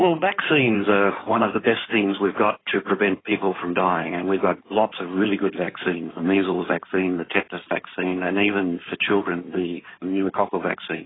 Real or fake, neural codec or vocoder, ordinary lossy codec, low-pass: fake; codec, 16 kHz in and 24 kHz out, 2.2 kbps, FireRedTTS-2 codec; AAC, 16 kbps; 7.2 kHz